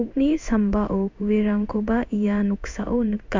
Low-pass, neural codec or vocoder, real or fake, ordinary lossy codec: 7.2 kHz; codec, 16 kHz in and 24 kHz out, 1 kbps, XY-Tokenizer; fake; MP3, 64 kbps